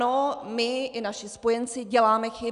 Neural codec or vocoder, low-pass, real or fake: none; 10.8 kHz; real